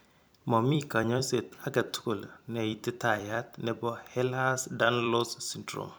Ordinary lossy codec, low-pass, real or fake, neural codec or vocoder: none; none; fake; vocoder, 44.1 kHz, 128 mel bands every 256 samples, BigVGAN v2